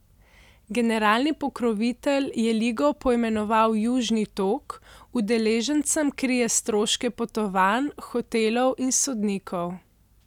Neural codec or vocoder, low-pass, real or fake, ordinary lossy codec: none; 19.8 kHz; real; none